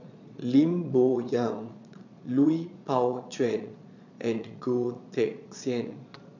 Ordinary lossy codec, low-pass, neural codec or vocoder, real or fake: none; 7.2 kHz; vocoder, 22.05 kHz, 80 mel bands, Vocos; fake